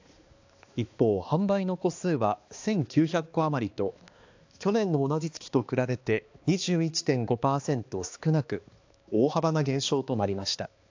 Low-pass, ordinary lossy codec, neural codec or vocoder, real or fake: 7.2 kHz; AAC, 48 kbps; codec, 16 kHz, 2 kbps, X-Codec, HuBERT features, trained on balanced general audio; fake